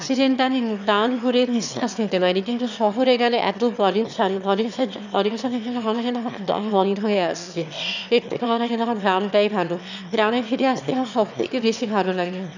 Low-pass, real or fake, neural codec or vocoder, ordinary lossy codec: 7.2 kHz; fake; autoencoder, 22.05 kHz, a latent of 192 numbers a frame, VITS, trained on one speaker; none